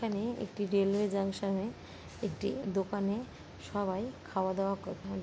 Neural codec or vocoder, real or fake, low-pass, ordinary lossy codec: none; real; none; none